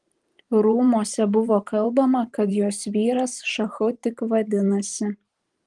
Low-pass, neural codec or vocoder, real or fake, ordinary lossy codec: 10.8 kHz; vocoder, 44.1 kHz, 128 mel bands every 512 samples, BigVGAN v2; fake; Opus, 24 kbps